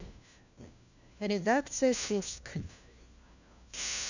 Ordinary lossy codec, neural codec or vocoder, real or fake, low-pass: none; codec, 16 kHz, 1 kbps, FunCodec, trained on LibriTTS, 50 frames a second; fake; 7.2 kHz